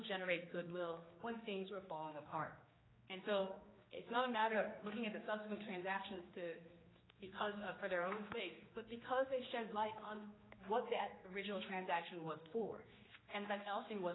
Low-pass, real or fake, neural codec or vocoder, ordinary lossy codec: 7.2 kHz; fake; codec, 16 kHz, 2 kbps, X-Codec, HuBERT features, trained on general audio; AAC, 16 kbps